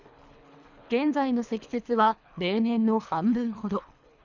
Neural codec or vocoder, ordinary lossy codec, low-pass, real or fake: codec, 24 kHz, 3 kbps, HILCodec; none; 7.2 kHz; fake